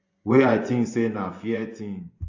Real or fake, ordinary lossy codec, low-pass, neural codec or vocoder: fake; AAC, 48 kbps; 7.2 kHz; vocoder, 24 kHz, 100 mel bands, Vocos